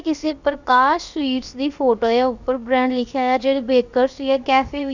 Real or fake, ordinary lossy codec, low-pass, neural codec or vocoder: fake; none; 7.2 kHz; codec, 16 kHz, about 1 kbps, DyCAST, with the encoder's durations